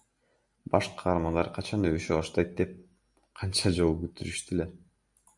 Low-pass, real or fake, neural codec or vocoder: 10.8 kHz; real; none